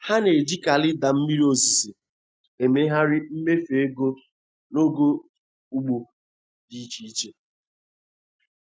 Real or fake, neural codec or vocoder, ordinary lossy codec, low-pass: real; none; none; none